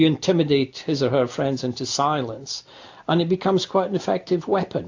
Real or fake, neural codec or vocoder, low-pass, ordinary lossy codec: real; none; 7.2 kHz; AAC, 48 kbps